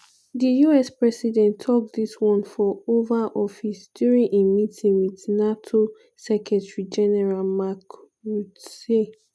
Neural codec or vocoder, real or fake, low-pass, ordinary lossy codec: none; real; none; none